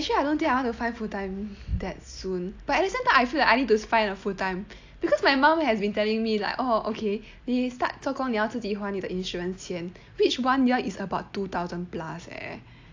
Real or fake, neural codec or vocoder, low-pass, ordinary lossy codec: real; none; 7.2 kHz; AAC, 48 kbps